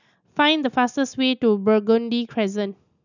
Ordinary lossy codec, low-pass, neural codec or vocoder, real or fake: none; 7.2 kHz; none; real